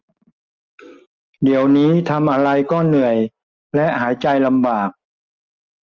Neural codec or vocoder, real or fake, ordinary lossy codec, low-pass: none; real; Opus, 32 kbps; 7.2 kHz